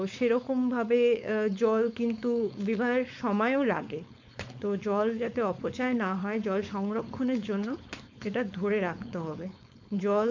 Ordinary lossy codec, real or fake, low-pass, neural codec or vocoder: MP3, 64 kbps; fake; 7.2 kHz; codec, 16 kHz, 4.8 kbps, FACodec